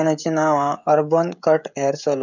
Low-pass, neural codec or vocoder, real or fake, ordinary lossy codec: 7.2 kHz; codec, 16 kHz, 16 kbps, FreqCodec, smaller model; fake; none